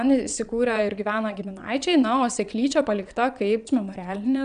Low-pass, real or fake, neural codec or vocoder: 9.9 kHz; fake; vocoder, 22.05 kHz, 80 mel bands, Vocos